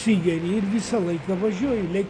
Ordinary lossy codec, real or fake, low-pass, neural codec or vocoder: AAC, 64 kbps; real; 9.9 kHz; none